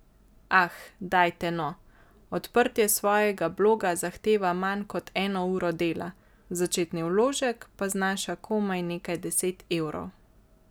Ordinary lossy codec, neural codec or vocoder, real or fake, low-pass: none; none; real; none